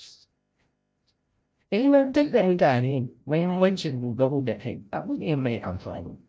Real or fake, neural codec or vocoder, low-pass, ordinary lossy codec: fake; codec, 16 kHz, 0.5 kbps, FreqCodec, larger model; none; none